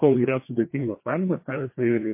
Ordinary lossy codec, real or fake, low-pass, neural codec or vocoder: MP3, 24 kbps; fake; 3.6 kHz; codec, 16 kHz, 2 kbps, FreqCodec, larger model